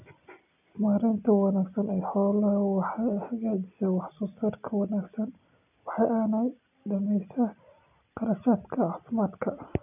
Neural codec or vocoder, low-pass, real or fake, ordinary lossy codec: none; 3.6 kHz; real; none